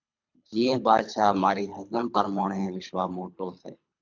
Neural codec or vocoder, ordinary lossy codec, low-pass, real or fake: codec, 24 kHz, 3 kbps, HILCodec; MP3, 64 kbps; 7.2 kHz; fake